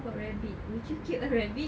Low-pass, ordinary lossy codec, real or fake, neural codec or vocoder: none; none; real; none